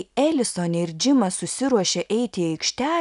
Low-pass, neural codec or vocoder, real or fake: 10.8 kHz; none; real